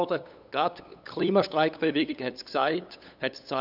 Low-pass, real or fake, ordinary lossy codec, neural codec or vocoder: 5.4 kHz; fake; none; codec, 16 kHz, 8 kbps, FunCodec, trained on LibriTTS, 25 frames a second